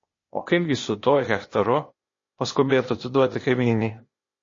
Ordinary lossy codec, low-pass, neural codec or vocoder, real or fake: MP3, 32 kbps; 7.2 kHz; codec, 16 kHz, 0.8 kbps, ZipCodec; fake